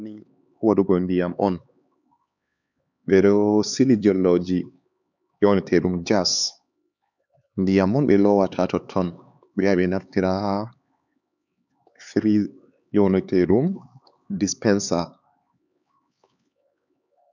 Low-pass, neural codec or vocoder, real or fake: 7.2 kHz; codec, 16 kHz, 4 kbps, X-Codec, HuBERT features, trained on LibriSpeech; fake